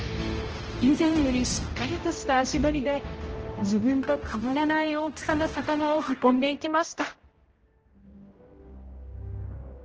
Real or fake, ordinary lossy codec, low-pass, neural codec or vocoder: fake; Opus, 16 kbps; 7.2 kHz; codec, 16 kHz, 0.5 kbps, X-Codec, HuBERT features, trained on general audio